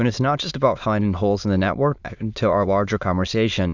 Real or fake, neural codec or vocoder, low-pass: fake; autoencoder, 22.05 kHz, a latent of 192 numbers a frame, VITS, trained on many speakers; 7.2 kHz